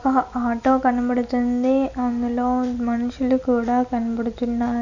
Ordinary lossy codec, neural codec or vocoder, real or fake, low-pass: none; none; real; 7.2 kHz